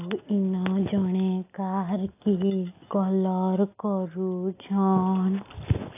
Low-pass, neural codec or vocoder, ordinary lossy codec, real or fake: 3.6 kHz; none; AAC, 24 kbps; real